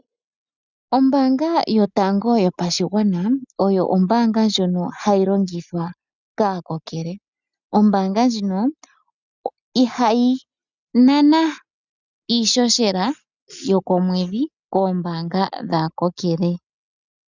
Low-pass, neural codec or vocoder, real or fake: 7.2 kHz; none; real